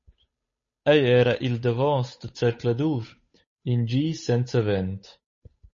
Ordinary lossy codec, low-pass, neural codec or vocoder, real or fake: MP3, 32 kbps; 7.2 kHz; codec, 16 kHz, 8 kbps, FunCodec, trained on Chinese and English, 25 frames a second; fake